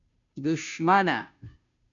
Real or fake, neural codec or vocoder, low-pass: fake; codec, 16 kHz, 0.5 kbps, FunCodec, trained on Chinese and English, 25 frames a second; 7.2 kHz